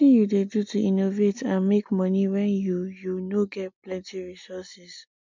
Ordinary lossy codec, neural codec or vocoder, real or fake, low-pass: MP3, 64 kbps; none; real; 7.2 kHz